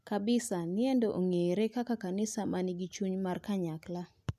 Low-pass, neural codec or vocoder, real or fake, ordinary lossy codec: 14.4 kHz; none; real; none